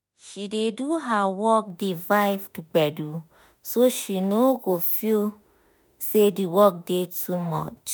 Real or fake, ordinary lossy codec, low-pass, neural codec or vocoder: fake; none; none; autoencoder, 48 kHz, 32 numbers a frame, DAC-VAE, trained on Japanese speech